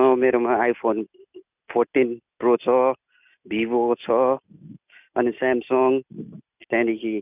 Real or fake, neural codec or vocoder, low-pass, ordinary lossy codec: real; none; 3.6 kHz; none